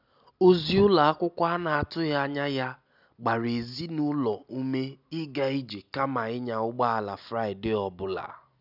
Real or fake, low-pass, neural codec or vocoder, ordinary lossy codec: real; 5.4 kHz; none; none